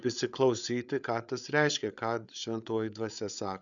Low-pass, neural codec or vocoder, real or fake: 7.2 kHz; codec, 16 kHz, 8 kbps, FreqCodec, larger model; fake